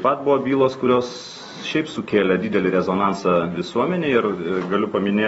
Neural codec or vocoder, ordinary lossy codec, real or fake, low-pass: none; AAC, 24 kbps; real; 19.8 kHz